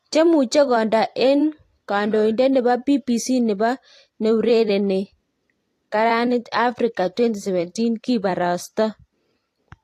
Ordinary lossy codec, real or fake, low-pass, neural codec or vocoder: AAC, 48 kbps; fake; 14.4 kHz; vocoder, 44.1 kHz, 128 mel bands every 256 samples, BigVGAN v2